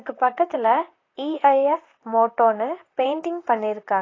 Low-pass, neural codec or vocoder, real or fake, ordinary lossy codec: 7.2 kHz; vocoder, 44.1 kHz, 128 mel bands, Pupu-Vocoder; fake; AAC, 32 kbps